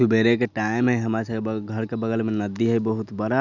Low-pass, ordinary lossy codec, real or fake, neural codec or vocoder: 7.2 kHz; none; real; none